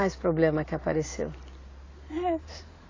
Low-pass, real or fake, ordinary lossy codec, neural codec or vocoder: 7.2 kHz; real; AAC, 32 kbps; none